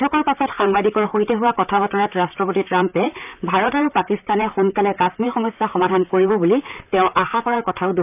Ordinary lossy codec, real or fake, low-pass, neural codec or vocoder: none; fake; 3.6 kHz; vocoder, 44.1 kHz, 128 mel bands, Pupu-Vocoder